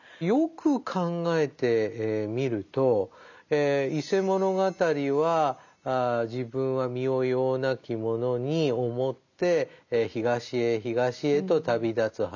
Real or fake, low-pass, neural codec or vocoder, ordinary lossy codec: real; 7.2 kHz; none; none